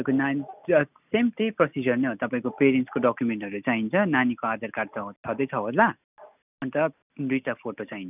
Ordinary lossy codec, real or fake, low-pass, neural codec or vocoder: none; real; 3.6 kHz; none